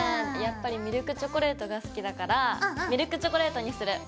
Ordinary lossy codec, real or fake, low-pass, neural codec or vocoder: none; real; none; none